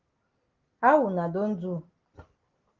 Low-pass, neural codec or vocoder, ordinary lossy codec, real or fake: 7.2 kHz; none; Opus, 16 kbps; real